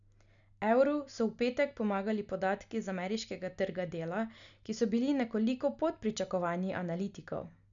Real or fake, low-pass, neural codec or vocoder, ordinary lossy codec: real; 7.2 kHz; none; none